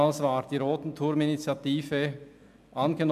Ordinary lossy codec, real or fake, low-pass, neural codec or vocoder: MP3, 96 kbps; real; 14.4 kHz; none